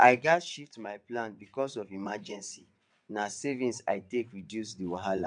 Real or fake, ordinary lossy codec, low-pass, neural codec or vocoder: fake; none; 9.9 kHz; vocoder, 22.05 kHz, 80 mel bands, WaveNeXt